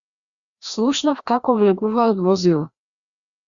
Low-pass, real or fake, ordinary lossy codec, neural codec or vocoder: 7.2 kHz; fake; Opus, 64 kbps; codec, 16 kHz, 1 kbps, FreqCodec, larger model